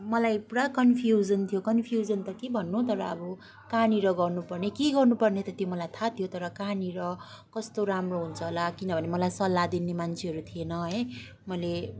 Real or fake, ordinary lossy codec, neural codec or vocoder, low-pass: real; none; none; none